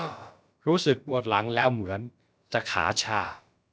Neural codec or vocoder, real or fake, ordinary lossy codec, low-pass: codec, 16 kHz, about 1 kbps, DyCAST, with the encoder's durations; fake; none; none